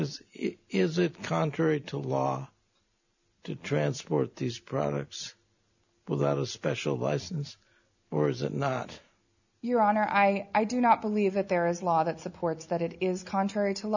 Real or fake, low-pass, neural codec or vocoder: real; 7.2 kHz; none